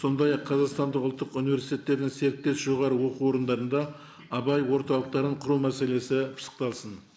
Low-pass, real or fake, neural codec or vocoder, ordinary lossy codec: none; real; none; none